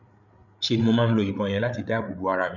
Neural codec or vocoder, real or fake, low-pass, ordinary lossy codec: codec, 16 kHz, 8 kbps, FreqCodec, larger model; fake; 7.2 kHz; none